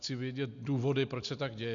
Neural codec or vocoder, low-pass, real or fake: none; 7.2 kHz; real